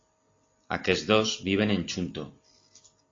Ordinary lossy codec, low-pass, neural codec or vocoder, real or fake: AAC, 32 kbps; 7.2 kHz; none; real